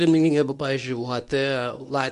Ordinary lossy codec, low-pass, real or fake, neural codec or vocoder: AAC, 96 kbps; 10.8 kHz; fake; codec, 24 kHz, 0.9 kbps, WavTokenizer, medium speech release version 1